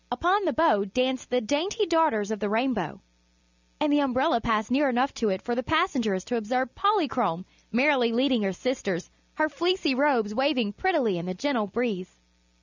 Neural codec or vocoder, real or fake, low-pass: none; real; 7.2 kHz